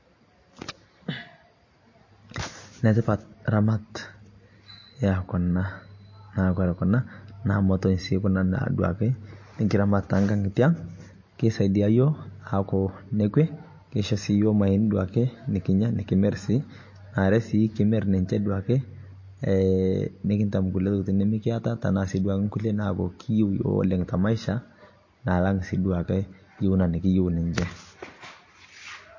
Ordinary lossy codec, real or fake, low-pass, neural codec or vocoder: MP3, 32 kbps; real; 7.2 kHz; none